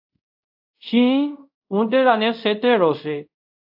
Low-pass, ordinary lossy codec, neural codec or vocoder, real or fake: 5.4 kHz; AAC, 48 kbps; codec, 24 kHz, 0.5 kbps, DualCodec; fake